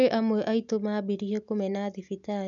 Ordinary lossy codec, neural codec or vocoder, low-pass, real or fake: none; none; 7.2 kHz; real